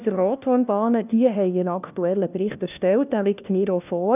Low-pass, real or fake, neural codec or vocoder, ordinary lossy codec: 3.6 kHz; fake; codec, 16 kHz, 1 kbps, FunCodec, trained on LibriTTS, 50 frames a second; none